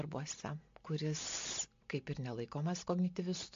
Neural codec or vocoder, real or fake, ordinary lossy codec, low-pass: none; real; MP3, 48 kbps; 7.2 kHz